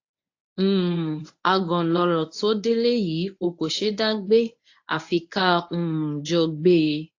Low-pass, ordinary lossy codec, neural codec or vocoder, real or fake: 7.2 kHz; AAC, 48 kbps; codec, 24 kHz, 0.9 kbps, WavTokenizer, medium speech release version 1; fake